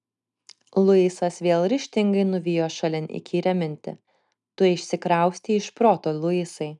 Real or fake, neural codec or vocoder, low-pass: real; none; 10.8 kHz